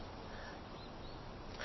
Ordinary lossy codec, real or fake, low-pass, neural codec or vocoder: MP3, 24 kbps; real; 7.2 kHz; none